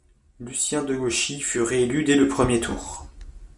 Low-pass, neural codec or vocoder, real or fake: 10.8 kHz; none; real